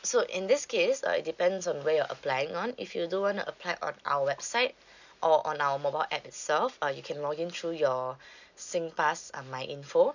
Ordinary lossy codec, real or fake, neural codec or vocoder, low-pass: none; real; none; 7.2 kHz